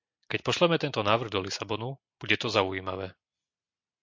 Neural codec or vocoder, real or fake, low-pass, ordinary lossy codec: none; real; 7.2 kHz; MP3, 48 kbps